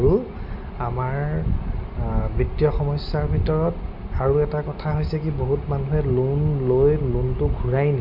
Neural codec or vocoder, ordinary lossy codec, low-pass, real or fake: none; none; 5.4 kHz; real